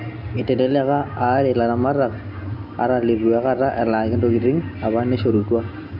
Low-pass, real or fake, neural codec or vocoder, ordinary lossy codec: 5.4 kHz; real; none; none